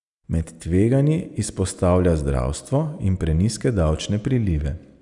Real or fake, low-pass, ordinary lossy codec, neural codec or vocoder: real; 10.8 kHz; none; none